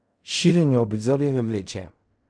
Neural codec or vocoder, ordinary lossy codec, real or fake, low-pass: codec, 16 kHz in and 24 kHz out, 0.4 kbps, LongCat-Audio-Codec, fine tuned four codebook decoder; none; fake; 9.9 kHz